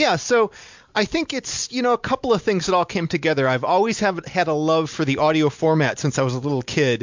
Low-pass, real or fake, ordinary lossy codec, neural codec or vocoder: 7.2 kHz; real; MP3, 64 kbps; none